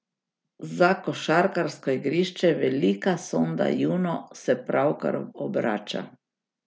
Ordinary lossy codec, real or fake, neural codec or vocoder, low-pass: none; real; none; none